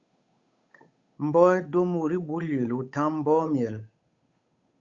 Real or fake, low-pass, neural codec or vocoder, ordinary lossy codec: fake; 7.2 kHz; codec, 16 kHz, 8 kbps, FunCodec, trained on Chinese and English, 25 frames a second; Opus, 64 kbps